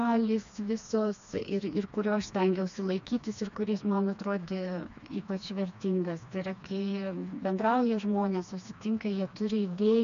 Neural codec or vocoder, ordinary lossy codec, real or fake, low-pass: codec, 16 kHz, 2 kbps, FreqCodec, smaller model; AAC, 96 kbps; fake; 7.2 kHz